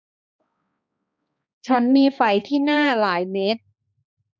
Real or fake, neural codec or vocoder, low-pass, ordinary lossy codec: fake; codec, 16 kHz, 2 kbps, X-Codec, HuBERT features, trained on balanced general audio; none; none